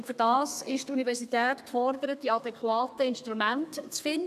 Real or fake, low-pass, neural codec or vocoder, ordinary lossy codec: fake; 14.4 kHz; codec, 32 kHz, 1.9 kbps, SNAC; none